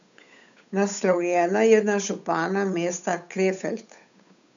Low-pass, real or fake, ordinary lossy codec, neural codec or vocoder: 7.2 kHz; fake; none; codec, 16 kHz, 8 kbps, FunCodec, trained on Chinese and English, 25 frames a second